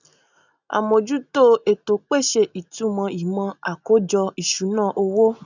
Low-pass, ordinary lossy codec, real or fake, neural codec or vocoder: 7.2 kHz; none; real; none